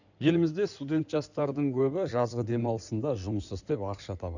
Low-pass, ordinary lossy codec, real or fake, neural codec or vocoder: 7.2 kHz; none; fake; codec, 16 kHz in and 24 kHz out, 2.2 kbps, FireRedTTS-2 codec